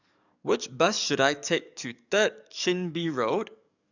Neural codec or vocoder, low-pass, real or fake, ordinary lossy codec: codec, 44.1 kHz, 7.8 kbps, DAC; 7.2 kHz; fake; none